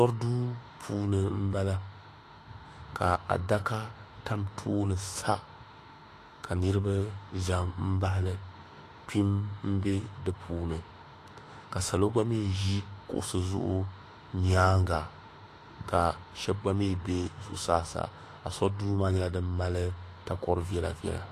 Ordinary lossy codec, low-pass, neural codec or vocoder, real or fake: AAC, 48 kbps; 14.4 kHz; autoencoder, 48 kHz, 32 numbers a frame, DAC-VAE, trained on Japanese speech; fake